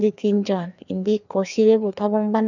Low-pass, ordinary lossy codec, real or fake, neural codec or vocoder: 7.2 kHz; none; fake; codec, 16 kHz, 2 kbps, FreqCodec, larger model